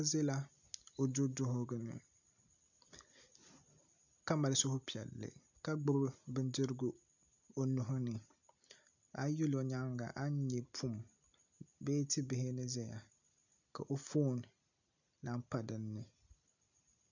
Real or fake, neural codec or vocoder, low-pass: real; none; 7.2 kHz